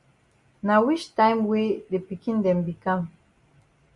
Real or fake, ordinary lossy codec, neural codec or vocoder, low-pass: real; Opus, 64 kbps; none; 10.8 kHz